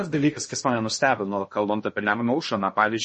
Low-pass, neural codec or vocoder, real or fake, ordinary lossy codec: 10.8 kHz; codec, 16 kHz in and 24 kHz out, 0.6 kbps, FocalCodec, streaming, 2048 codes; fake; MP3, 32 kbps